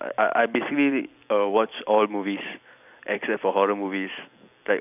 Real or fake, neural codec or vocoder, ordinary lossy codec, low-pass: fake; vocoder, 44.1 kHz, 128 mel bands every 256 samples, BigVGAN v2; none; 3.6 kHz